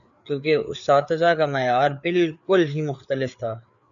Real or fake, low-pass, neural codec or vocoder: fake; 7.2 kHz; codec, 16 kHz, 4 kbps, FreqCodec, larger model